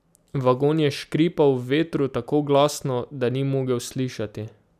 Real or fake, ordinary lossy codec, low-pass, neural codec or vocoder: real; none; 14.4 kHz; none